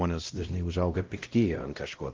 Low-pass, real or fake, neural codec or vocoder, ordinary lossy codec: 7.2 kHz; fake; codec, 16 kHz, 0.5 kbps, X-Codec, WavLM features, trained on Multilingual LibriSpeech; Opus, 16 kbps